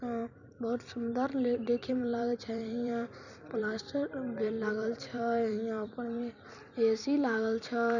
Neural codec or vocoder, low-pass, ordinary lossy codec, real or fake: vocoder, 44.1 kHz, 128 mel bands every 256 samples, BigVGAN v2; 7.2 kHz; none; fake